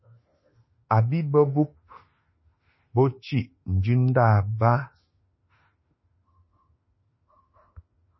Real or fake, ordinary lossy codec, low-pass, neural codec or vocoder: fake; MP3, 24 kbps; 7.2 kHz; autoencoder, 48 kHz, 32 numbers a frame, DAC-VAE, trained on Japanese speech